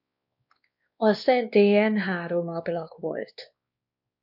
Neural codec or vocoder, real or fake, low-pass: codec, 16 kHz, 2 kbps, X-Codec, WavLM features, trained on Multilingual LibriSpeech; fake; 5.4 kHz